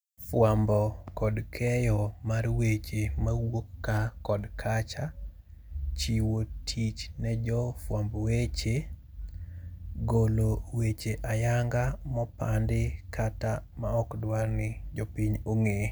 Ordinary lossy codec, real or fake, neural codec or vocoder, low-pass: none; real; none; none